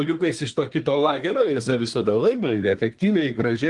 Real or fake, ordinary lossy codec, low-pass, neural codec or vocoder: fake; Opus, 24 kbps; 10.8 kHz; codec, 24 kHz, 1 kbps, SNAC